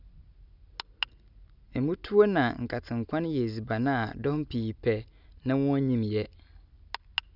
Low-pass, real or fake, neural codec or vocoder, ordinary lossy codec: 5.4 kHz; real; none; none